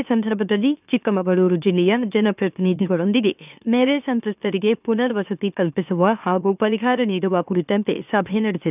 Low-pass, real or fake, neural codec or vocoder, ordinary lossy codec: 3.6 kHz; fake; autoencoder, 44.1 kHz, a latent of 192 numbers a frame, MeloTTS; none